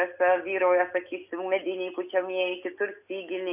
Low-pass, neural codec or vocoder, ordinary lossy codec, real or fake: 3.6 kHz; none; AAC, 32 kbps; real